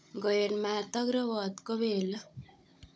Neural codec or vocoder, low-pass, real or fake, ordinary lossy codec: codec, 16 kHz, 16 kbps, FunCodec, trained on Chinese and English, 50 frames a second; none; fake; none